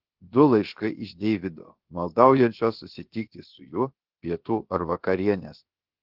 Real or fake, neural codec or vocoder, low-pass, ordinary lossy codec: fake; codec, 16 kHz, about 1 kbps, DyCAST, with the encoder's durations; 5.4 kHz; Opus, 16 kbps